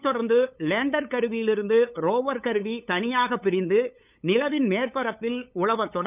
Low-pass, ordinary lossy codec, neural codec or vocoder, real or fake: 3.6 kHz; none; codec, 16 kHz, 8 kbps, FunCodec, trained on LibriTTS, 25 frames a second; fake